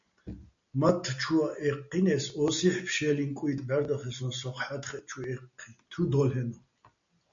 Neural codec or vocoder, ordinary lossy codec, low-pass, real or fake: none; MP3, 64 kbps; 7.2 kHz; real